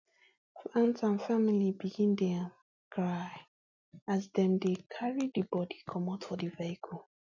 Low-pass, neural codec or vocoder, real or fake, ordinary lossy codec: 7.2 kHz; none; real; none